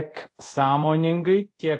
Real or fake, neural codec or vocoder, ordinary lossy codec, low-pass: fake; codec, 24 kHz, 0.5 kbps, DualCodec; AAC, 48 kbps; 10.8 kHz